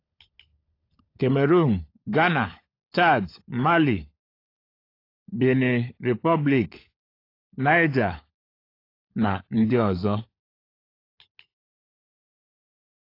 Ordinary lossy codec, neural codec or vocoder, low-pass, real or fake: AAC, 32 kbps; codec, 16 kHz, 16 kbps, FunCodec, trained on LibriTTS, 50 frames a second; 5.4 kHz; fake